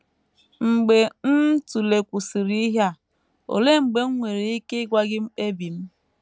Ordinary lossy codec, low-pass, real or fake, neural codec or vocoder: none; none; real; none